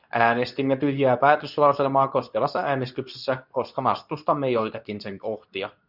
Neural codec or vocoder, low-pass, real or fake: codec, 24 kHz, 0.9 kbps, WavTokenizer, medium speech release version 2; 5.4 kHz; fake